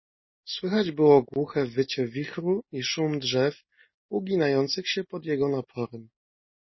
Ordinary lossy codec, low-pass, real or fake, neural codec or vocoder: MP3, 24 kbps; 7.2 kHz; real; none